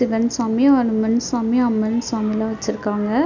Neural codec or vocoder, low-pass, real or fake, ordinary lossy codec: none; 7.2 kHz; real; none